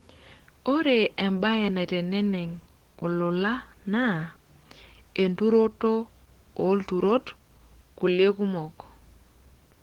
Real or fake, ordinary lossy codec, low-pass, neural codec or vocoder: fake; Opus, 16 kbps; 19.8 kHz; codec, 44.1 kHz, 7.8 kbps, DAC